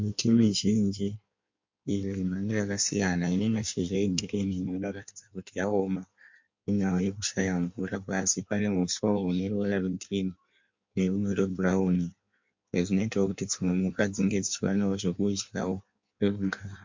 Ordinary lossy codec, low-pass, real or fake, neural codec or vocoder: MP3, 48 kbps; 7.2 kHz; fake; codec, 16 kHz in and 24 kHz out, 1.1 kbps, FireRedTTS-2 codec